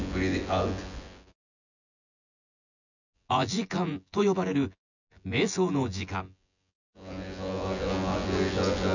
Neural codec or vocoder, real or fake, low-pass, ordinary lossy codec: vocoder, 24 kHz, 100 mel bands, Vocos; fake; 7.2 kHz; none